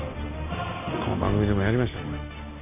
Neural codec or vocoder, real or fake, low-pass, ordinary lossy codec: none; real; 3.6 kHz; none